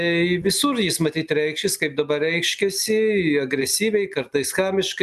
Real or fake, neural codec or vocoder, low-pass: real; none; 14.4 kHz